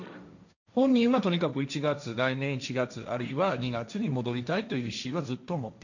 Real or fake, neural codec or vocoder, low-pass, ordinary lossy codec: fake; codec, 16 kHz, 1.1 kbps, Voila-Tokenizer; 7.2 kHz; none